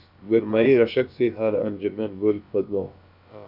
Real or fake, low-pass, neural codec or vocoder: fake; 5.4 kHz; codec, 16 kHz, about 1 kbps, DyCAST, with the encoder's durations